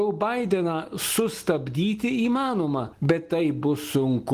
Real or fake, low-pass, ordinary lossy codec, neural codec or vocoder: real; 14.4 kHz; Opus, 24 kbps; none